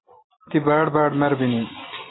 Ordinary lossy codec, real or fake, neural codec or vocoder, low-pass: AAC, 16 kbps; real; none; 7.2 kHz